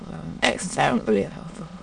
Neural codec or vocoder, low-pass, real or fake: autoencoder, 22.05 kHz, a latent of 192 numbers a frame, VITS, trained on many speakers; 9.9 kHz; fake